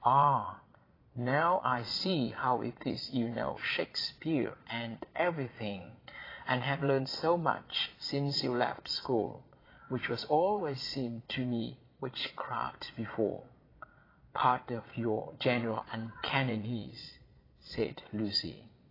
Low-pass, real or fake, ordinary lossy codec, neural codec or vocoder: 5.4 kHz; fake; AAC, 24 kbps; vocoder, 22.05 kHz, 80 mel bands, Vocos